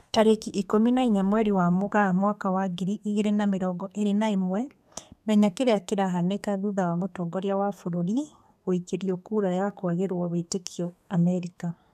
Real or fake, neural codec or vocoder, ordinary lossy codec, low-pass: fake; codec, 32 kHz, 1.9 kbps, SNAC; none; 14.4 kHz